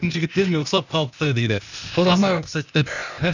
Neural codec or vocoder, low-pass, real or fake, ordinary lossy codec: codec, 16 kHz, 0.8 kbps, ZipCodec; 7.2 kHz; fake; none